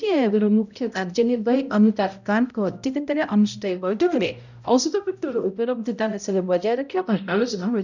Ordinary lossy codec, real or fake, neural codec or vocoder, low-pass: none; fake; codec, 16 kHz, 0.5 kbps, X-Codec, HuBERT features, trained on balanced general audio; 7.2 kHz